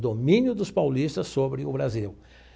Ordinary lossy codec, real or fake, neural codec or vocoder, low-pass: none; real; none; none